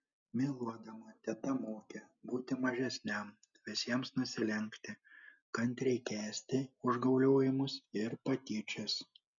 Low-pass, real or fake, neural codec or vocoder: 7.2 kHz; real; none